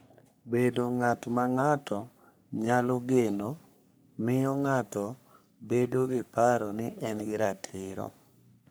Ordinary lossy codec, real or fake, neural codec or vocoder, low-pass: none; fake; codec, 44.1 kHz, 3.4 kbps, Pupu-Codec; none